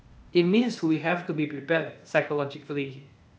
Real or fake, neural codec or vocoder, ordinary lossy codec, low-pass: fake; codec, 16 kHz, 0.8 kbps, ZipCodec; none; none